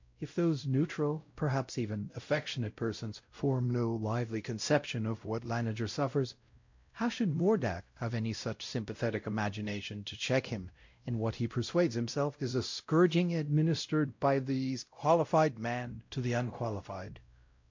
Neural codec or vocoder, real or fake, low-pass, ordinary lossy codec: codec, 16 kHz, 0.5 kbps, X-Codec, WavLM features, trained on Multilingual LibriSpeech; fake; 7.2 kHz; MP3, 48 kbps